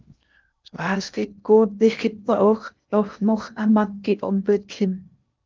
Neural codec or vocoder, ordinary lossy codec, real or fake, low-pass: codec, 16 kHz in and 24 kHz out, 0.6 kbps, FocalCodec, streaming, 2048 codes; Opus, 24 kbps; fake; 7.2 kHz